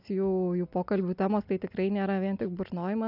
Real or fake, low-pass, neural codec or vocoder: real; 5.4 kHz; none